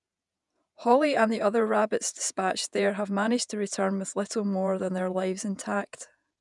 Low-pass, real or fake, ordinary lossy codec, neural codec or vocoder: 10.8 kHz; fake; none; vocoder, 48 kHz, 128 mel bands, Vocos